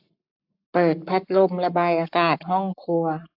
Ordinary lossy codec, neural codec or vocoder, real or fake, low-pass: AAC, 48 kbps; codec, 44.1 kHz, 7.8 kbps, Pupu-Codec; fake; 5.4 kHz